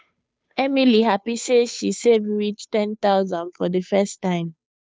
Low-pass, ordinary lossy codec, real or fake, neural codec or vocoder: none; none; fake; codec, 16 kHz, 2 kbps, FunCodec, trained on Chinese and English, 25 frames a second